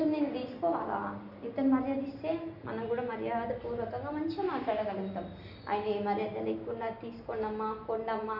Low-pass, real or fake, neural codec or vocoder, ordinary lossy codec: 5.4 kHz; real; none; none